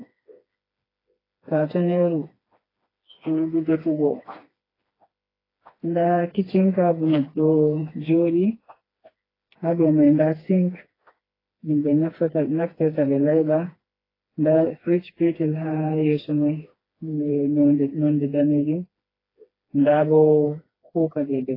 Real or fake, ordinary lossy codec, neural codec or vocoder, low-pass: fake; AAC, 24 kbps; codec, 16 kHz, 2 kbps, FreqCodec, smaller model; 5.4 kHz